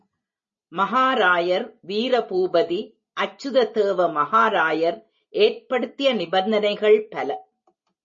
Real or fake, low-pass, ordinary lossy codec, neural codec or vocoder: real; 7.2 kHz; MP3, 32 kbps; none